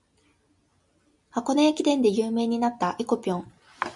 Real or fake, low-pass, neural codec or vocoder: real; 10.8 kHz; none